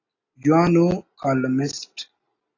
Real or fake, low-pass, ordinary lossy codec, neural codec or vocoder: real; 7.2 kHz; AAC, 32 kbps; none